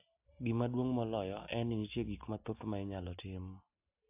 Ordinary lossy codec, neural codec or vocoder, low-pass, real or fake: AAC, 32 kbps; none; 3.6 kHz; real